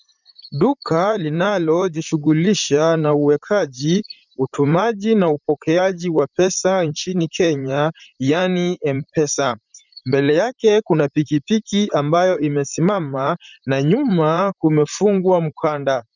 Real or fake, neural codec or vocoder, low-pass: fake; vocoder, 44.1 kHz, 128 mel bands, Pupu-Vocoder; 7.2 kHz